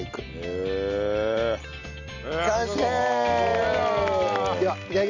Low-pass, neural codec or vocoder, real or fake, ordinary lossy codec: 7.2 kHz; none; real; none